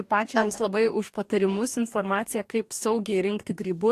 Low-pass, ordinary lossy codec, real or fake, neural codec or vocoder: 14.4 kHz; AAC, 64 kbps; fake; codec, 44.1 kHz, 2.6 kbps, DAC